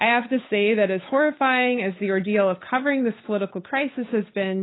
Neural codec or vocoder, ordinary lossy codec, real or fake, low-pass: codec, 16 kHz, 8 kbps, FunCodec, trained on Chinese and English, 25 frames a second; AAC, 16 kbps; fake; 7.2 kHz